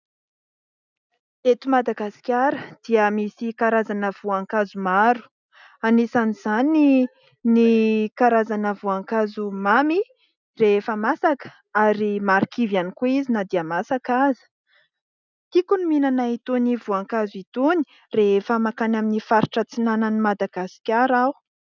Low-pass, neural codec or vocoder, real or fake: 7.2 kHz; none; real